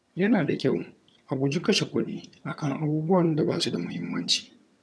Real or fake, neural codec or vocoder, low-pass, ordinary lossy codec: fake; vocoder, 22.05 kHz, 80 mel bands, HiFi-GAN; none; none